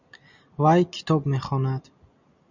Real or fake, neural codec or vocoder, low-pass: real; none; 7.2 kHz